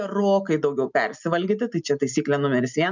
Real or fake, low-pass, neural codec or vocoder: real; 7.2 kHz; none